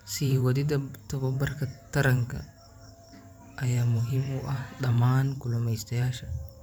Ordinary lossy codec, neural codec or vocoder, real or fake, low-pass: none; vocoder, 44.1 kHz, 128 mel bands every 512 samples, BigVGAN v2; fake; none